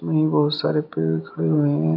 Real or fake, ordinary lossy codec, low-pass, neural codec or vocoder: real; none; 5.4 kHz; none